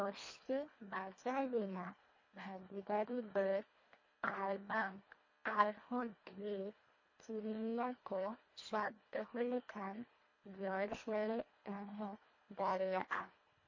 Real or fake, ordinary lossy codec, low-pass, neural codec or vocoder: fake; MP3, 32 kbps; 7.2 kHz; codec, 24 kHz, 1.5 kbps, HILCodec